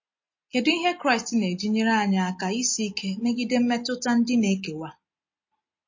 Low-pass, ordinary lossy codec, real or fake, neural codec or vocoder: 7.2 kHz; MP3, 32 kbps; real; none